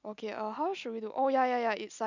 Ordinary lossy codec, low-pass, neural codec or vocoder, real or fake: none; 7.2 kHz; none; real